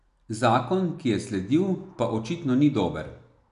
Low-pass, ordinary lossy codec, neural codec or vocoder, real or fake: 10.8 kHz; none; none; real